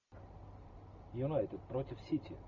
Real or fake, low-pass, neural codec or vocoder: real; 7.2 kHz; none